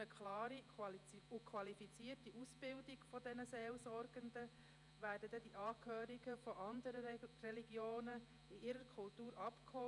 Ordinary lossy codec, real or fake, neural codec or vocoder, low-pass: none; fake; vocoder, 48 kHz, 128 mel bands, Vocos; 10.8 kHz